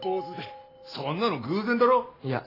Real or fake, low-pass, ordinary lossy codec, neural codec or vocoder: real; 5.4 kHz; AAC, 24 kbps; none